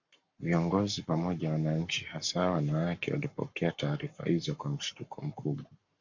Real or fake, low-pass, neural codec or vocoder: fake; 7.2 kHz; codec, 44.1 kHz, 7.8 kbps, Pupu-Codec